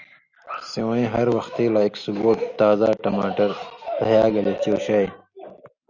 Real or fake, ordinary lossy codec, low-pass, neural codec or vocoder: real; Opus, 64 kbps; 7.2 kHz; none